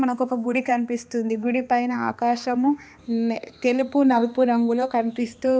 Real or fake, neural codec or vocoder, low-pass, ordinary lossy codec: fake; codec, 16 kHz, 2 kbps, X-Codec, HuBERT features, trained on balanced general audio; none; none